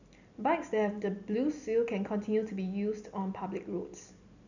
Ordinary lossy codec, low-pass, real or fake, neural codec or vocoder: none; 7.2 kHz; real; none